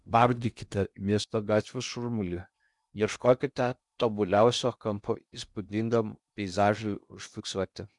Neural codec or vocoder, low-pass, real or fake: codec, 16 kHz in and 24 kHz out, 0.6 kbps, FocalCodec, streaming, 2048 codes; 10.8 kHz; fake